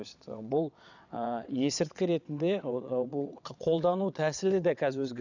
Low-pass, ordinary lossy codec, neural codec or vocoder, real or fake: 7.2 kHz; none; vocoder, 22.05 kHz, 80 mel bands, WaveNeXt; fake